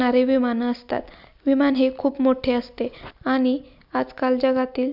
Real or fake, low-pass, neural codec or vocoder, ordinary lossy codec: real; 5.4 kHz; none; none